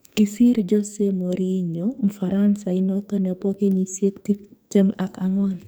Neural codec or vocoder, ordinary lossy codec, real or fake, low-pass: codec, 44.1 kHz, 2.6 kbps, SNAC; none; fake; none